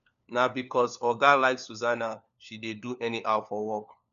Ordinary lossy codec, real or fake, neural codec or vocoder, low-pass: none; fake; codec, 16 kHz, 4 kbps, FunCodec, trained on LibriTTS, 50 frames a second; 7.2 kHz